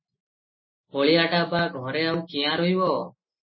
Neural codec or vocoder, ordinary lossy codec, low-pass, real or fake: none; MP3, 24 kbps; 7.2 kHz; real